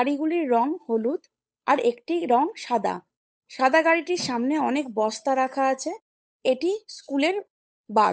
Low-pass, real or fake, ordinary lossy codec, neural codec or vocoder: none; fake; none; codec, 16 kHz, 8 kbps, FunCodec, trained on Chinese and English, 25 frames a second